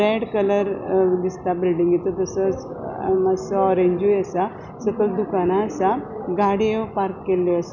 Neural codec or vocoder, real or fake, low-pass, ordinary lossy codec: none; real; 7.2 kHz; none